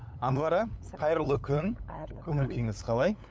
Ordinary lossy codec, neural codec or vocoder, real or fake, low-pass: none; codec, 16 kHz, 8 kbps, FunCodec, trained on LibriTTS, 25 frames a second; fake; none